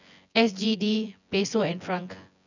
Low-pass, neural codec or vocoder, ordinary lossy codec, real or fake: 7.2 kHz; vocoder, 24 kHz, 100 mel bands, Vocos; none; fake